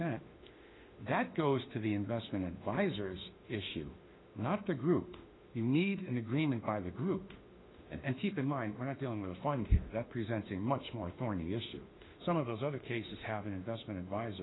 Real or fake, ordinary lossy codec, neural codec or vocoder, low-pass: fake; AAC, 16 kbps; autoencoder, 48 kHz, 32 numbers a frame, DAC-VAE, trained on Japanese speech; 7.2 kHz